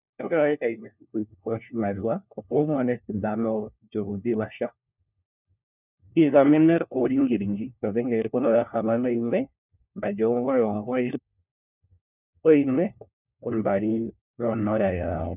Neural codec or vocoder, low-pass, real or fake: codec, 16 kHz, 1 kbps, FunCodec, trained on LibriTTS, 50 frames a second; 3.6 kHz; fake